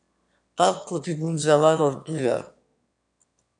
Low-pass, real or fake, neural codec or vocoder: 9.9 kHz; fake; autoencoder, 22.05 kHz, a latent of 192 numbers a frame, VITS, trained on one speaker